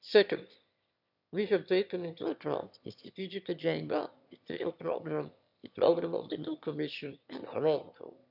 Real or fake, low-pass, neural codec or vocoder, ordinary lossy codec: fake; 5.4 kHz; autoencoder, 22.05 kHz, a latent of 192 numbers a frame, VITS, trained on one speaker; none